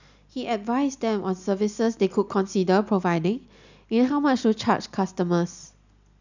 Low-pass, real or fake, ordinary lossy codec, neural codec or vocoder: 7.2 kHz; real; none; none